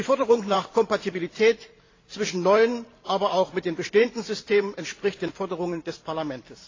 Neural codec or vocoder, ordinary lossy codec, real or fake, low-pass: vocoder, 44.1 kHz, 128 mel bands every 512 samples, BigVGAN v2; AAC, 32 kbps; fake; 7.2 kHz